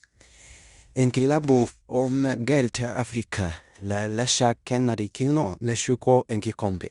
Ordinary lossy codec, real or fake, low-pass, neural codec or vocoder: Opus, 64 kbps; fake; 10.8 kHz; codec, 16 kHz in and 24 kHz out, 0.9 kbps, LongCat-Audio-Codec, fine tuned four codebook decoder